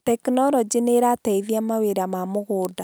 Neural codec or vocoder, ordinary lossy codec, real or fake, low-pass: none; none; real; none